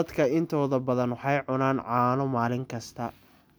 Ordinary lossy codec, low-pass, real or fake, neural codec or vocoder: none; none; real; none